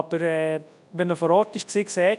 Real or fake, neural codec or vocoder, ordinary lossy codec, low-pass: fake; codec, 24 kHz, 0.9 kbps, WavTokenizer, large speech release; none; 10.8 kHz